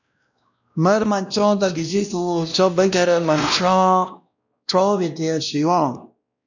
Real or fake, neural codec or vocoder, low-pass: fake; codec, 16 kHz, 1 kbps, X-Codec, WavLM features, trained on Multilingual LibriSpeech; 7.2 kHz